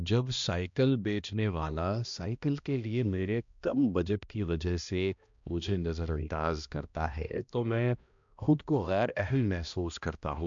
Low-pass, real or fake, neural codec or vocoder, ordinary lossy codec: 7.2 kHz; fake; codec, 16 kHz, 1 kbps, X-Codec, HuBERT features, trained on balanced general audio; MP3, 64 kbps